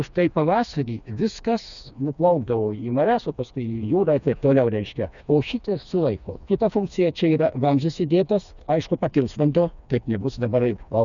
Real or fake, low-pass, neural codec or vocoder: fake; 7.2 kHz; codec, 16 kHz, 2 kbps, FreqCodec, smaller model